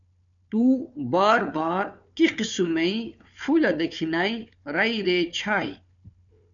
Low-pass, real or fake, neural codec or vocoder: 7.2 kHz; fake; codec, 16 kHz, 4 kbps, FunCodec, trained on Chinese and English, 50 frames a second